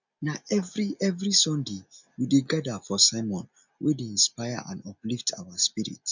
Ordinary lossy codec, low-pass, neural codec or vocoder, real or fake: none; 7.2 kHz; none; real